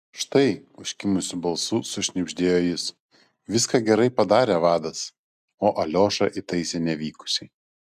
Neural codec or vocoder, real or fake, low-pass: none; real; 14.4 kHz